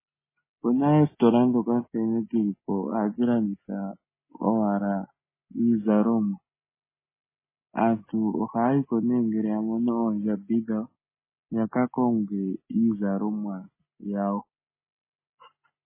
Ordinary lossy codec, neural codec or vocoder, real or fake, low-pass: MP3, 16 kbps; none; real; 3.6 kHz